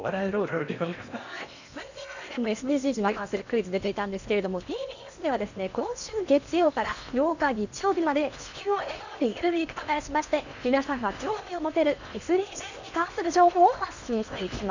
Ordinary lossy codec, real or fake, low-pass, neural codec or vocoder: none; fake; 7.2 kHz; codec, 16 kHz in and 24 kHz out, 0.8 kbps, FocalCodec, streaming, 65536 codes